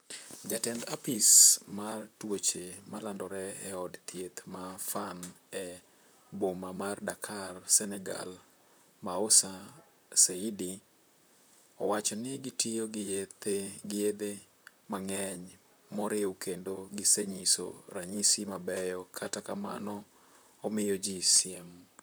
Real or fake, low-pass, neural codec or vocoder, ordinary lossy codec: fake; none; vocoder, 44.1 kHz, 128 mel bands, Pupu-Vocoder; none